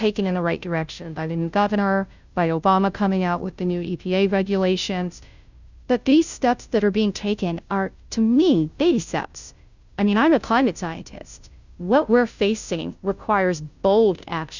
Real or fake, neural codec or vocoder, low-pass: fake; codec, 16 kHz, 0.5 kbps, FunCodec, trained on Chinese and English, 25 frames a second; 7.2 kHz